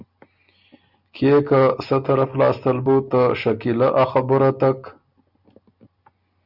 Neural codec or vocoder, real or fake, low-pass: none; real; 5.4 kHz